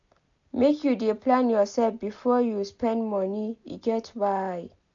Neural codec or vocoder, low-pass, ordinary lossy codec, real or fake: none; 7.2 kHz; AAC, 64 kbps; real